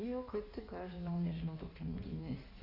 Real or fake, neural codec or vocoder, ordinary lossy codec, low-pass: fake; codec, 16 kHz in and 24 kHz out, 1.1 kbps, FireRedTTS-2 codec; Opus, 64 kbps; 5.4 kHz